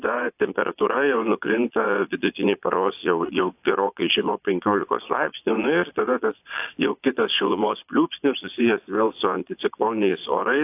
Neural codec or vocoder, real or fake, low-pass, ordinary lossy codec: vocoder, 22.05 kHz, 80 mel bands, Vocos; fake; 3.6 kHz; AAC, 32 kbps